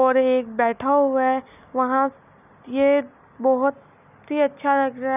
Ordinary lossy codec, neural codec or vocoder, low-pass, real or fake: none; none; 3.6 kHz; real